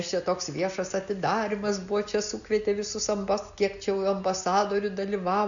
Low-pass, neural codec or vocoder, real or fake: 7.2 kHz; none; real